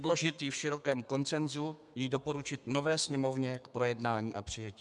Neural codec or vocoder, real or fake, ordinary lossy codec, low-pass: codec, 32 kHz, 1.9 kbps, SNAC; fake; MP3, 96 kbps; 10.8 kHz